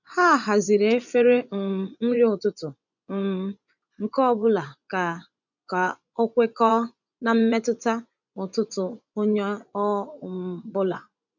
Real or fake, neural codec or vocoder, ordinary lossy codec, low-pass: fake; vocoder, 24 kHz, 100 mel bands, Vocos; none; 7.2 kHz